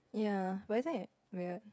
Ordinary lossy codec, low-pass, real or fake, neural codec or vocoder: none; none; fake; codec, 16 kHz, 8 kbps, FreqCodec, smaller model